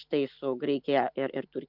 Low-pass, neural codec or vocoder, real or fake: 5.4 kHz; none; real